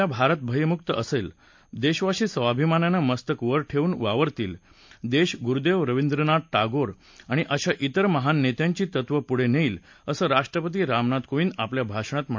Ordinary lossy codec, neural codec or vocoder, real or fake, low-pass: MP3, 48 kbps; none; real; 7.2 kHz